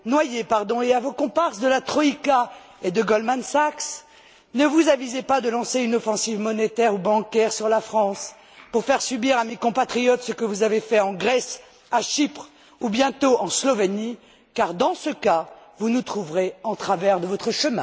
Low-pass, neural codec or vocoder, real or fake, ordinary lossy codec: none; none; real; none